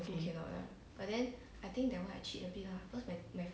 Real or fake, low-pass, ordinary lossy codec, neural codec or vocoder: real; none; none; none